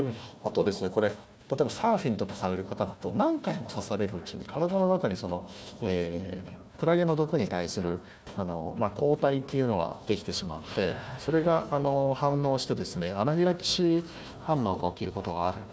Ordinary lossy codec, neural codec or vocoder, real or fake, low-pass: none; codec, 16 kHz, 1 kbps, FunCodec, trained on Chinese and English, 50 frames a second; fake; none